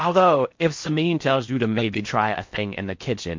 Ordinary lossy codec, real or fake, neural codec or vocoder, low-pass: MP3, 48 kbps; fake; codec, 16 kHz in and 24 kHz out, 0.6 kbps, FocalCodec, streaming, 4096 codes; 7.2 kHz